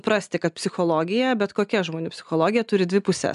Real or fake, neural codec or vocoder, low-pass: real; none; 10.8 kHz